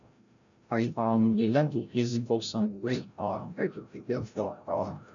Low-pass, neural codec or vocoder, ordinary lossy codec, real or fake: 7.2 kHz; codec, 16 kHz, 0.5 kbps, FreqCodec, larger model; none; fake